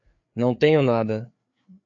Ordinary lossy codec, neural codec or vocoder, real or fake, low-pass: AAC, 48 kbps; codec, 16 kHz, 4 kbps, FreqCodec, larger model; fake; 7.2 kHz